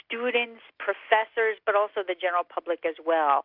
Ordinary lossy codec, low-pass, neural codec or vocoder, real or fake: Opus, 64 kbps; 5.4 kHz; none; real